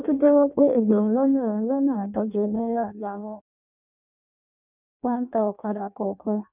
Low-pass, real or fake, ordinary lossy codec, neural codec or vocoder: 3.6 kHz; fake; none; codec, 16 kHz in and 24 kHz out, 0.6 kbps, FireRedTTS-2 codec